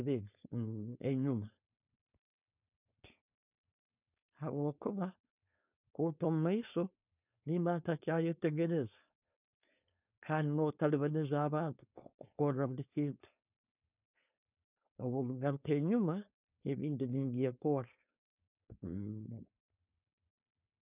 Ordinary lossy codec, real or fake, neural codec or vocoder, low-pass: none; fake; codec, 16 kHz, 4.8 kbps, FACodec; 3.6 kHz